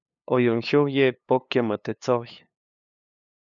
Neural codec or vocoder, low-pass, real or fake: codec, 16 kHz, 2 kbps, FunCodec, trained on LibriTTS, 25 frames a second; 7.2 kHz; fake